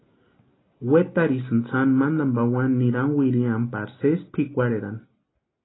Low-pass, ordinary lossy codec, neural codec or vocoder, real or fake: 7.2 kHz; AAC, 16 kbps; none; real